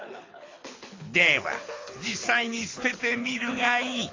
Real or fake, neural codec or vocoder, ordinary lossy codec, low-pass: fake; codec, 24 kHz, 6 kbps, HILCodec; AAC, 32 kbps; 7.2 kHz